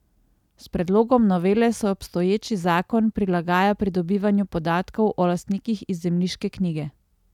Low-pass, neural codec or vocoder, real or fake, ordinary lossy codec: 19.8 kHz; none; real; none